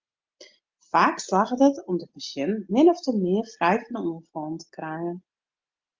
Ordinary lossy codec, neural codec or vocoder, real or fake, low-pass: Opus, 24 kbps; none; real; 7.2 kHz